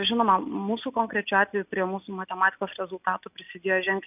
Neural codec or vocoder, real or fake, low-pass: none; real; 3.6 kHz